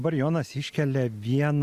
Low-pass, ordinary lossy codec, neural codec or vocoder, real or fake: 14.4 kHz; Opus, 64 kbps; none; real